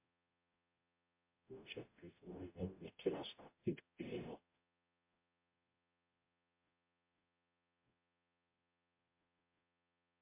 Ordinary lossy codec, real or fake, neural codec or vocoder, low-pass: MP3, 24 kbps; fake; codec, 44.1 kHz, 0.9 kbps, DAC; 3.6 kHz